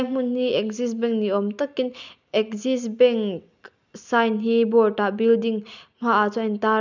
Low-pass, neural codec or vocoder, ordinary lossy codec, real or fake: 7.2 kHz; none; none; real